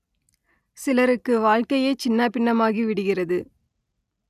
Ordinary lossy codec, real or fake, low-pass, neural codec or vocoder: none; real; 14.4 kHz; none